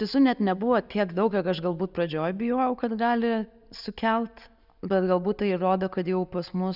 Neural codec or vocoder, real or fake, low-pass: none; real; 5.4 kHz